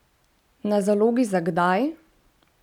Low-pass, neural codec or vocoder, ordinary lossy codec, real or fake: 19.8 kHz; none; none; real